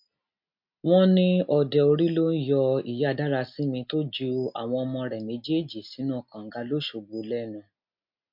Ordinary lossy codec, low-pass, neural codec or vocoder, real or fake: MP3, 48 kbps; 5.4 kHz; none; real